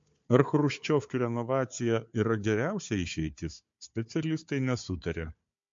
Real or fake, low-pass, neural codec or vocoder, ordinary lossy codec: fake; 7.2 kHz; codec, 16 kHz, 4 kbps, FunCodec, trained on Chinese and English, 50 frames a second; MP3, 48 kbps